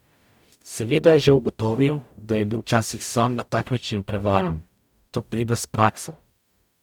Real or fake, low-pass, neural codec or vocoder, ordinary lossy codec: fake; 19.8 kHz; codec, 44.1 kHz, 0.9 kbps, DAC; none